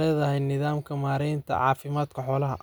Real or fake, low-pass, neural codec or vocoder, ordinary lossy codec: real; none; none; none